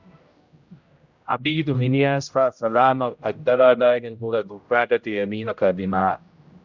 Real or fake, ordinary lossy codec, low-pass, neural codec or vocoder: fake; Opus, 64 kbps; 7.2 kHz; codec, 16 kHz, 0.5 kbps, X-Codec, HuBERT features, trained on general audio